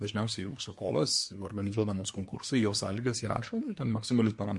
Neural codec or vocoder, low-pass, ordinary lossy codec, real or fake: codec, 24 kHz, 1 kbps, SNAC; 10.8 kHz; MP3, 48 kbps; fake